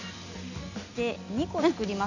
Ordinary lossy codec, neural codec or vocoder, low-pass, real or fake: none; none; 7.2 kHz; real